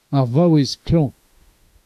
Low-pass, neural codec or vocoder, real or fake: 14.4 kHz; autoencoder, 48 kHz, 32 numbers a frame, DAC-VAE, trained on Japanese speech; fake